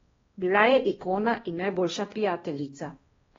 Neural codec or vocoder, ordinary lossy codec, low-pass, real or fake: codec, 16 kHz, 1 kbps, X-Codec, HuBERT features, trained on balanced general audio; AAC, 24 kbps; 7.2 kHz; fake